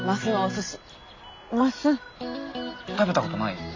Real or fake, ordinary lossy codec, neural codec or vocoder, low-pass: real; AAC, 32 kbps; none; 7.2 kHz